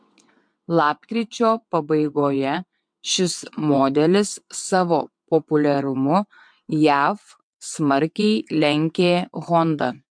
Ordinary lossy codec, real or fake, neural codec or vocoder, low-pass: MP3, 64 kbps; fake; vocoder, 22.05 kHz, 80 mel bands, WaveNeXt; 9.9 kHz